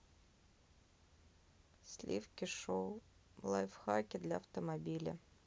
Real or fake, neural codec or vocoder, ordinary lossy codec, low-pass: real; none; none; none